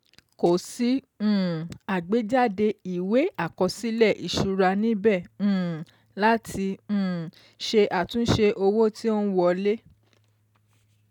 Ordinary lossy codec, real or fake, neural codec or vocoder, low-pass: none; real; none; 19.8 kHz